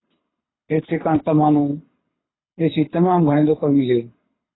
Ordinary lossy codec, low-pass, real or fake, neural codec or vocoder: AAC, 16 kbps; 7.2 kHz; fake; codec, 24 kHz, 6 kbps, HILCodec